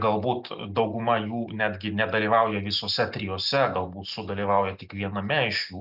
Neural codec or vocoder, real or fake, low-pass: none; real; 5.4 kHz